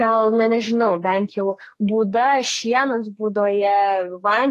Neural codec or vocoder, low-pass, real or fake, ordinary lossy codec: codec, 44.1 kHz, 2.6 kbps, SNAC; 14.4 kHz; fake; AAC, 48 kbps